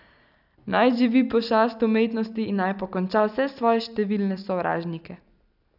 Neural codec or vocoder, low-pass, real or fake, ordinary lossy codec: none; 5.4 kHz; real; none